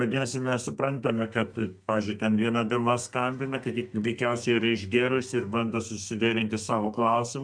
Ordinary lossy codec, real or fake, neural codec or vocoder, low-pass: MP3, 64 kbps; fake; codec, 32 kHz, 1.9 kbps, SNAC; 9.9 kHz